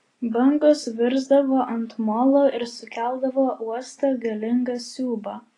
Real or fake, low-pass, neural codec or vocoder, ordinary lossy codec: real; 10.8 kHz; none; AAC, 32 kbps